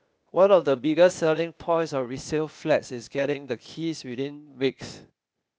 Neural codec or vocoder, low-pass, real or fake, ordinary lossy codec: codec, 16 kHz, 0.8 kbps, ZipCodec; none; fake; none